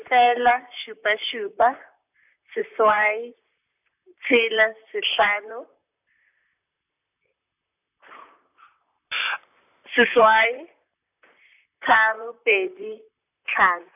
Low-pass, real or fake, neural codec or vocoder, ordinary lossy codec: 3.6 kHz; fake; vocoder, 44.1 kHz, 128 mel bands, Pupu-Vocoder; none